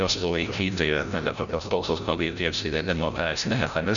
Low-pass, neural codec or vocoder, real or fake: 7.2 kHz; codec, 16 kHz, 0.5 kbps, FreqCodec, larger model; fake